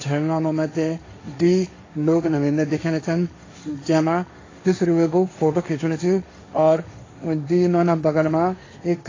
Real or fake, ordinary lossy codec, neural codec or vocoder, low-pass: fake; AAC, 32 kbps; codec, 16 kHz, 1.1 kbps, Voila-Tokenizer; 7.2 kHz